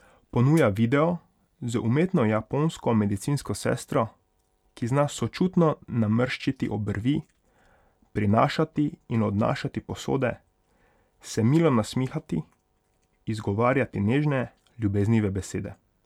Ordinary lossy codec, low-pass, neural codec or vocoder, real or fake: none; 19.8 kHz; none; real